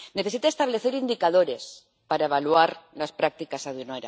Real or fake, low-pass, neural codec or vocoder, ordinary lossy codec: real; none; none; none